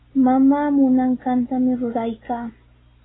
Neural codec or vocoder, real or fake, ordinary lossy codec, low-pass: none; real; AAC, 16 kbps; 7.2 kHz